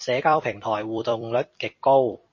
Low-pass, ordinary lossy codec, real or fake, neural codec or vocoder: 7.2 kHz; MP3, 32 kbps; fake; vocoder, 44.1 kHz, 128 mel bands every 256 samples, BigVGAN v2